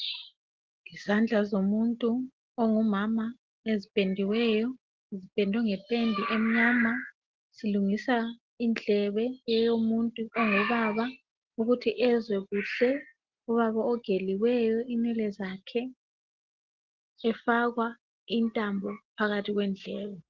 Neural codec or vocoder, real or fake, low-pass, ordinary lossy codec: none; real; 7.2 kHz; Opus, 16 kbps